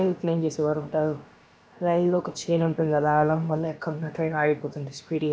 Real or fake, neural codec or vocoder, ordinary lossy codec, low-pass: fake; codec, 16 kHz, about 1 kbps, DyCAST, with the encoder's durations; none; none